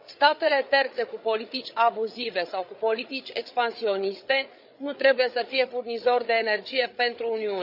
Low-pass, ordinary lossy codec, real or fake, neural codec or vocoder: 5.4 kHz; none; fake; codec, 16 kHz, 8 kbps, FreqCodec, larger model